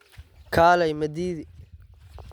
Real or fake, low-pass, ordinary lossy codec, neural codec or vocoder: real; 19.8 kHz; none; none